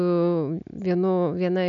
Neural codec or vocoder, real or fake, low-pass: none; real; 7.2 kHz